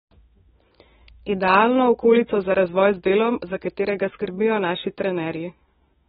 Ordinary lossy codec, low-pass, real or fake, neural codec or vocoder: AAC, 16 kbps; 19.8 kHz; fake; autoencoder, 48 kHz, 128 numbers a frame, DAC-VAE, trained on Japanese speech